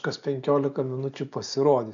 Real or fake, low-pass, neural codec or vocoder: real; 7.2 kHz; none